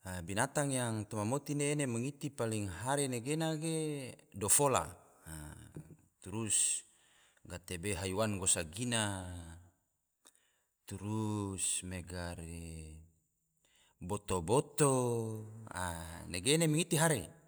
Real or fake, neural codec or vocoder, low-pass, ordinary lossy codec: real; none; none; none